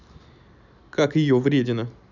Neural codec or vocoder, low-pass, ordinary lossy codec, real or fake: none; 7.2 kHz; none; real